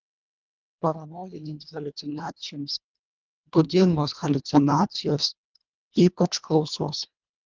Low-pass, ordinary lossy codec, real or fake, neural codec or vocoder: 7.2 kHz; Opus, 32 kbps; fake; codec, 24 kHz, 1.5 kbps, HILCodec